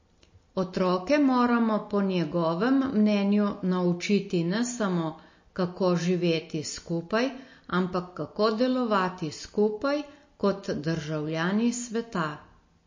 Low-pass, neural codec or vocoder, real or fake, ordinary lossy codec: 7.2 kHz; none; real; MP3, 32 kbps